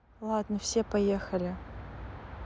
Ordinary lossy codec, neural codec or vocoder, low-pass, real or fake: none; none; none; real